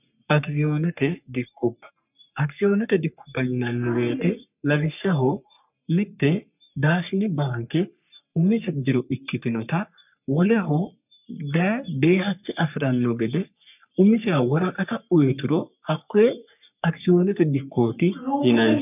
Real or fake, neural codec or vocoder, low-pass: fake; codec, 44.1 kHz, 3.4 kbps, Pupu-Codec; 3.6 kHz